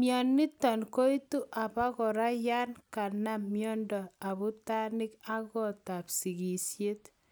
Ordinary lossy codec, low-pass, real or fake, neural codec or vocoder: none; none; real; none